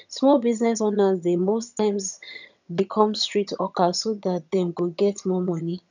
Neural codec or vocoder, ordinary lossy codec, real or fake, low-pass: vocoder, 22.05 kHz, 80 mel bands, HiFi-GAN; none; fake; 7.2 kHz